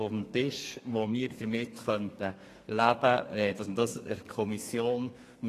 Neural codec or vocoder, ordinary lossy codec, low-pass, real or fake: codec, 32 kHz, 1.9 kbps, SNAC; AAC, 48 kbps; 14.4 kHz; fake